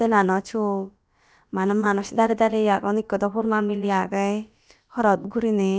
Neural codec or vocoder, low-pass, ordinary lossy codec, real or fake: codec, 16 kHz, about 1 kbps, DyCAST, with the encoder's durations; none; none; fake